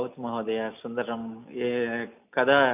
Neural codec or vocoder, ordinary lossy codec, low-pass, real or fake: none; none; 3.6 kHz; real